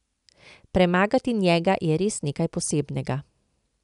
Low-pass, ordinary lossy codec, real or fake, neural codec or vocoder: 10.8 kHz; none; real; none